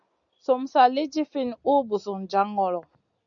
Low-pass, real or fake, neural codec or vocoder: 7.2 kHz; real; none